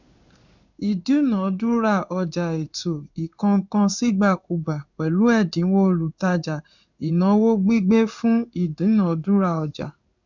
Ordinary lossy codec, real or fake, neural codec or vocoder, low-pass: none; fake; codec, 16 kHz in and 24 kHz out, 1 kbps, XY-Tokenizer; 7.2 kHz